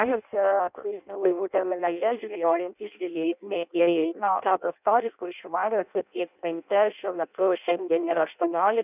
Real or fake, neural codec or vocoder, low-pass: fake; codec, 16 kHz in and 24 kHz out, 0.6 kbps, FireRedTTS-2 codec; 3.6 kHz